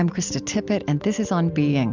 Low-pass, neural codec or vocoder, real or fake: 7.2 kHz; none; real